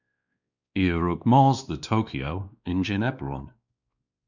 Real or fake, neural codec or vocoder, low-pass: fake; codec, 16 kHz, 2 kbps, X-Codec, WavLM features, trained on Multilingual LibriSpeech; 7.2 kHz